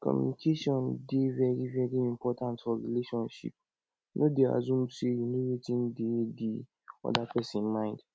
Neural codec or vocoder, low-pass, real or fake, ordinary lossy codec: none; none; real; none